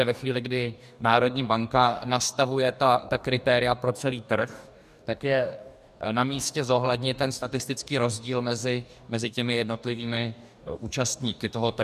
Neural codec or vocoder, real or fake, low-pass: codec, 44.1 kHz, 2.6 kbps, DAC; fake; 14.4 kHz